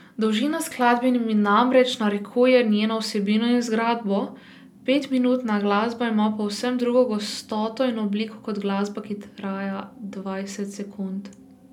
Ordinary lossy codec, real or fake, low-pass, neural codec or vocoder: none; real; 19.8 kHz; none